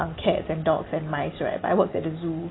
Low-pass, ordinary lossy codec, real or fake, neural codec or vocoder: 7.2 kHz; AAC, 16 kbps; real; none